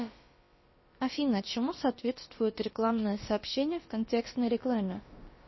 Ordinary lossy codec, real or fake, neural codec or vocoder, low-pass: MP3, 24 kbps; fake; codec, 16 kHz, about 1 kbps, DyCAST, with the encoder's durations; 7.2 kHz